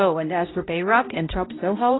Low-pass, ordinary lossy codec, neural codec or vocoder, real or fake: 7.2 kHz; AAC, 16 kbps; codec, 16 kHz, 0.5 kbps, X-Codec, HuBERT features, trained on balanced general audio; fake